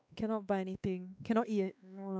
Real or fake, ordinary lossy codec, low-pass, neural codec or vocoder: fake; none; none; codec, 16 kHz, 2 kbps, X-Codec, WavLM features, trained on Multilingual LibriSpeech